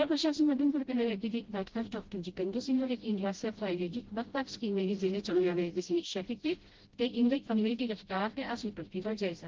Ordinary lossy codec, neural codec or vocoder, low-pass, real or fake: Opus, 16 kbps; codec, 16 kHz, 0.5 kbps, FreqCodec, smaller model; 7.2 kHz; fake